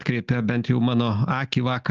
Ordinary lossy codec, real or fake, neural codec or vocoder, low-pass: Opus, 24 kbps; real; none; 7.2 kHz